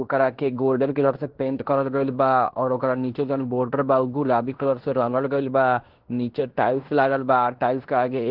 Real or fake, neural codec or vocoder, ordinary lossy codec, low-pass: fake; codec, 16 kHz in and 24 kHz out, 0.9 kbps, LongCat-Audio-Codec, fine tuned four codebook decoder; Opus, 16 kbps; 5.4 kHz